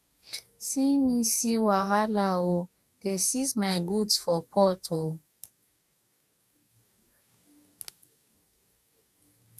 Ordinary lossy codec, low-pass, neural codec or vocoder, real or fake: none; 14.4 kHz; codec, 44.1 kHz, 2.6 kbps, DAC; fake